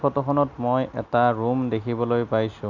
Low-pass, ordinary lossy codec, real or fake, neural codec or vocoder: 7.2 kHz; none; real; none